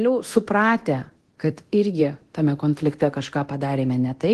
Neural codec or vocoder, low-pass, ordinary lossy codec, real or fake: codec, 24 kHz, 0.9 kbps, DualCodec; 10.8 kHz; Opus, 16 kbps; fake